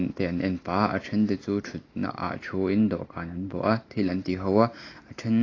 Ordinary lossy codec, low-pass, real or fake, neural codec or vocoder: AAC, 32 kbps; 7.2 kHz; real; none